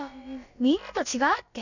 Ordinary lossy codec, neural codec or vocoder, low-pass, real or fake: none; codec, 16 kHz, about 1 kbps, DyCAST, with the encoder's durations; 7.2 kHz; fake